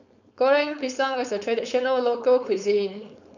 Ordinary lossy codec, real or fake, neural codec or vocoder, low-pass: none; fake; codec, 16 kHz, 4.8 kbps, FACodec; 7.2 kHz